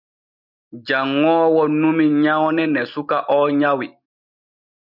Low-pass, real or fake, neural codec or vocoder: 5.4 kHz; real; none